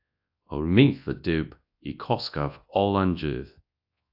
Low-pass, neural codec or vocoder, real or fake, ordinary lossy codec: 5.4 kHz; codec, 24 kHz, 0.9 kbps, WavTokenizer, large speech release; fake; AAC, 48 kbps